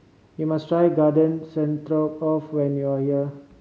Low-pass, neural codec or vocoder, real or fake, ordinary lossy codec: none; none; real; none